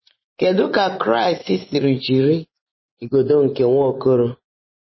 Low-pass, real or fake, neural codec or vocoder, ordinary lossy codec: 7.2 kHz; fake; vocoder, 24 kHz, 100 mel bands, Vocos; MP3, 24 kbps